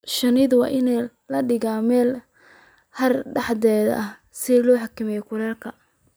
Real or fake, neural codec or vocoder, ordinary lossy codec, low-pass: real; none; none; none